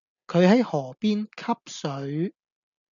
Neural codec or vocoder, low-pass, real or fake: none; 7.2 kHz; real